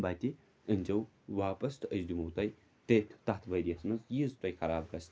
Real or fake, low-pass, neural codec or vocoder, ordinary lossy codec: real; none; none; none